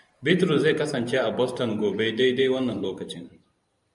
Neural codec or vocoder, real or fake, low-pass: vocoder, 44.1 kHz, 128 mel bands every 512 samples, BigVGAN v2; fake; 10.8 kHz